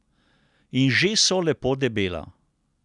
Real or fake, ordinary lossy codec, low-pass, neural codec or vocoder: real; none; 10.8 kHz; none